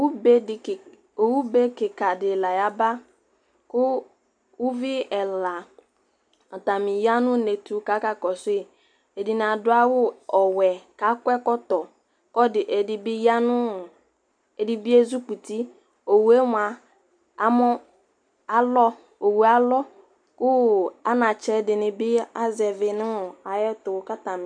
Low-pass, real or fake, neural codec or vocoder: 9.9 kHz; real; none